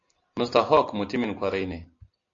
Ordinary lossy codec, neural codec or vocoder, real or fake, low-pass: AAC, 48 kbps; none; real; 7.2 kHz